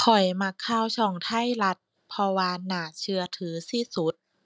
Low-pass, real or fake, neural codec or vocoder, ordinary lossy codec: none; real; none; none